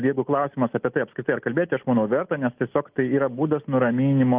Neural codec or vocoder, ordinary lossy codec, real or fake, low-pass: none; Opus, 24 kbps; real; 3.6 kHz